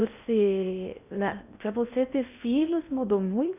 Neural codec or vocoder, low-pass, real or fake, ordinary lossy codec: codec, 16 kHz in and 24 kHz out, 0.6 kbps, FocalCodec, streaming, 4096 codes; 3.6 kHz; fake; none